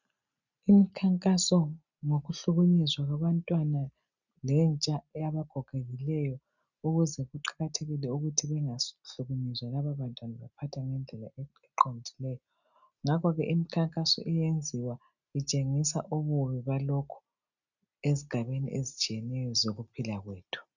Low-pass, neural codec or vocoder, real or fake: 7.2 kHz; none; real